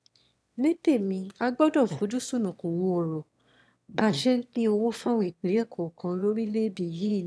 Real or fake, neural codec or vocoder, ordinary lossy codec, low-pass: fake; autoencoder, 22.05 kHz, a latent of 192 numbers a frame, VITS, trained on one speaker; none; none